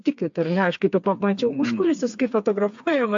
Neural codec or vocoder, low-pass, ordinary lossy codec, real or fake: codec, 16 kHz, 4 kbps, FreqCodec, smaller model; 7.2 kHz; AAC, 64 kbps; fake